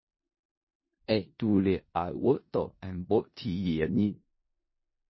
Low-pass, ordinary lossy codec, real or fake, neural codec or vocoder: 7.2 kHz; MP3, 24 kbps; fake; codec, 16 kHz in and 24 kHz out, 0.4 kbps, LongCat-Audio-Codec, four codebook decoder